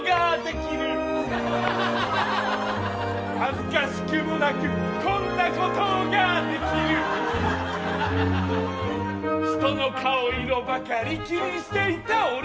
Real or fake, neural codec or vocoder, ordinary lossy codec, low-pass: real; none; none; none